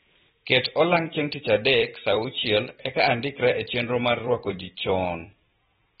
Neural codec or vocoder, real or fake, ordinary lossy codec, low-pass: vocoder, 44.1 kHz, 128 mel bands every 256 samples, BigVGAN v2; fake; AAC, 16 kbps; 19.8 kHz